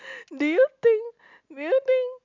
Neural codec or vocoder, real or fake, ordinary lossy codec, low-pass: none; real; MP3, 64 kbps; 7.2 kHz